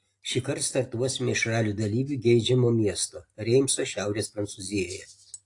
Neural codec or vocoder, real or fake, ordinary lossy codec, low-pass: none; real; AAC, 48 kbps; 10.8 kHz